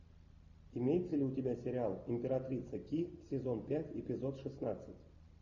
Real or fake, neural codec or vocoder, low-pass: real; none; 7.2 kHz